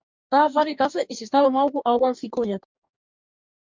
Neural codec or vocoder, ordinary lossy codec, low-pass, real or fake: codec, 44.1 kHz, 2.6 kbps, DAC; MP3, 64 kbps; 7.2 kHz; fake